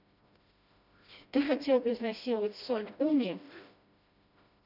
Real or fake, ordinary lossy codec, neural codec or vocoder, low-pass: fake; none; codec, 16 kHz, 1 kbps, FreqCodec, smaller model; 5.4 kHz